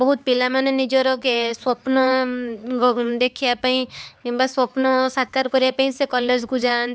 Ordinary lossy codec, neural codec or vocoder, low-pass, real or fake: none; codec, 16 kHz, 4 kbps, X-Codec, HuBERT features, trained on LibriSpeech; none; fake